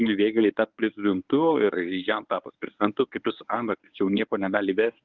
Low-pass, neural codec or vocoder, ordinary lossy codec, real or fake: 7.2 kHz; codec, 24 kHz, 0.9 kbps, WavTokenizer, medium speech release version 1; Opus, 32 kbps; fake